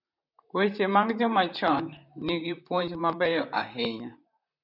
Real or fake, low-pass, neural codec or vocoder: fake; 5.4 kHz; vocoder, 44.1 kHz, 128 mel bands, Pupu-Vocoder